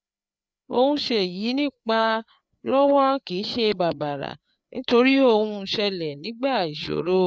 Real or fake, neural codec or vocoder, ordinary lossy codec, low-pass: fake; codec, 16 kHz, 4 kbps, FreqCodec, larger model; none; none